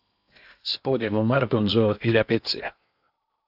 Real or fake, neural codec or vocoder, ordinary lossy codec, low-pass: fake; codec, 16 kHz in and 24 kHz out, 0.8 kbps, FocalCodec, streaming, 65536 codes; AAC, 48 kbps; 5.4 kHz